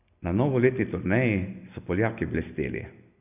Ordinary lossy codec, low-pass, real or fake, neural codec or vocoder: none; 3.6 kHz; real; none